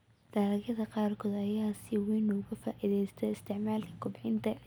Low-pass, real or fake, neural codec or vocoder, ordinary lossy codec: none; real; none; none